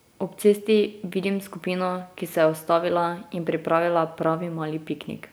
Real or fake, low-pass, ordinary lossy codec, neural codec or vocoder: real; none; none; none